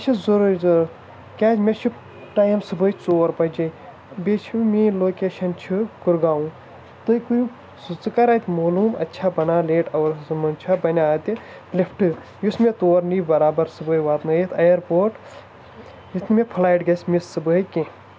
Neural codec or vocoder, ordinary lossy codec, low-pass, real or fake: none; none; none; real